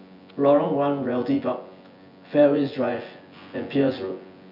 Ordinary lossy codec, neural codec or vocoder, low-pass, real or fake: none; vocoder, 24 kHz, 100 mel bands, Vocos; 5.4 kHz; fake